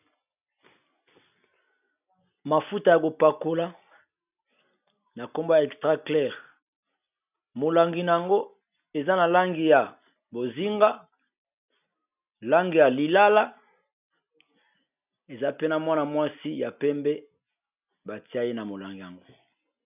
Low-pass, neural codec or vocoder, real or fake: 3.6 kHz; none; real